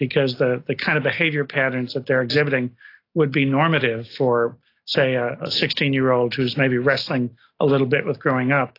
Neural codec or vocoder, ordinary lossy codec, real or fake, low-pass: none; AAC, 32 kbps; real; 5.4 kHz